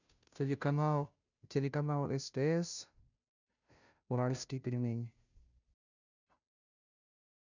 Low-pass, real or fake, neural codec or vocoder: 7.2 kHz; fake; codec, 16 kHz, 0.5 kbps, FunCodec, trained on Chinese and English, 25 frames a second